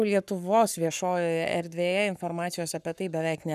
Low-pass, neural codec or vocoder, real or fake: 14.4 kHz; codec, 44.1 kHz, 7.8 kbps, Pupu-Codec; fake